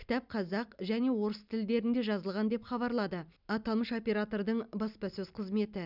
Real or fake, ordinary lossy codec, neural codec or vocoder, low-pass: real; none; none; 5.4 kHz